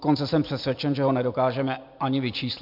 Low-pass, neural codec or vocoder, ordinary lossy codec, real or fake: 5.4 kHz; none; MP3, 48 kbps; real